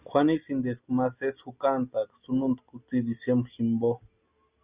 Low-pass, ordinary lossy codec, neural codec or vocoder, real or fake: 3.6 kHz; Opus, 64 kbps; none; real